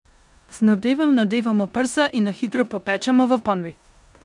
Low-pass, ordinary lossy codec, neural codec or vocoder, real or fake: 10.8 kHz; none; codec, 16 kHz in and 24 kHz out, 0.9 kbps, LongCat-Audio-Codec, four codebook decoder; fake